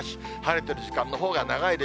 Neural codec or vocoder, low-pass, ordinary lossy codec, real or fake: none; none; none; real